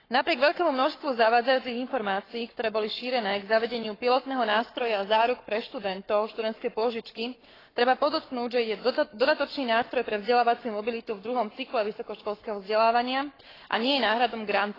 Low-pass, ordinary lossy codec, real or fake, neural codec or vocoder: 5.4 kHz; AAC, 24 kbps; fake; codec, 44.1 kHz, 7.8 kbps, Pupu-Codec